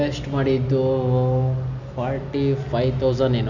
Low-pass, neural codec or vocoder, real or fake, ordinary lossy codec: 7.2 kHz; none; real; none